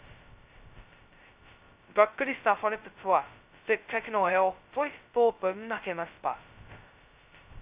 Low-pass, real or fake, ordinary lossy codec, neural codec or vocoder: 3.6 kHz; fake; Opus, 64 kbps; codec, 16 kHz, 0.2 kbps, FocalCodec